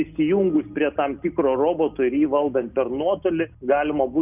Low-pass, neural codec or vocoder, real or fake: 3.6 kHz; none; real